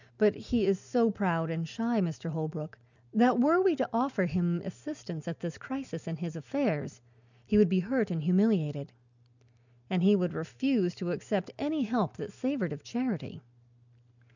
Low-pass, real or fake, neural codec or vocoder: 7.2 kHz; real; none